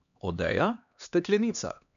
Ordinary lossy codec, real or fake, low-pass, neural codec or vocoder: none; fake; 7.2 kHz; codec, 16 kHz, 2 kbps, X-Codec, HuBERT features, trained on LibriSpeech